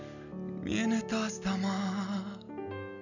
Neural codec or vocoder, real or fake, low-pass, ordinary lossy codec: none; real; 7.2 kHz; none